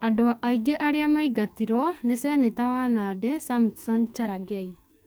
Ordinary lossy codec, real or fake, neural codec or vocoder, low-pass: none; fake; codec, 44.1 kHz, 2.6 kbps, SNAC; none